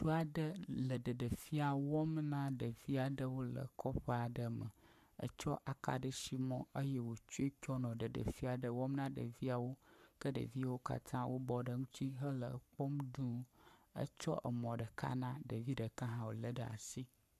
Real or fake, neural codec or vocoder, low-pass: fake; codec, 44.1 kHz, 7.8 kbps, Pupu-Codec; 14.4 kHz